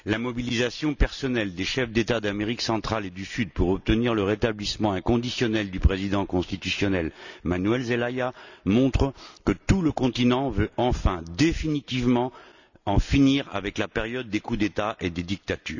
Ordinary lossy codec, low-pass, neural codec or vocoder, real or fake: none; 7.2 kHz; none; real